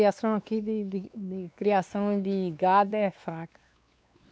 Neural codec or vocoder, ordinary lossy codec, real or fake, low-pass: codec, 16 kHz, 2 kbps, X-Codec, WavLM features, trained on Multilingual LibriSpeech; none; fake; none